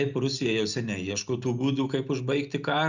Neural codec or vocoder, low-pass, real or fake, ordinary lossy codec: none; 7.2 kHz; real; Opus, 64 kbps